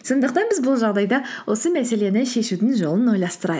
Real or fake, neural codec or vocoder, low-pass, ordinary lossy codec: real; none; none; none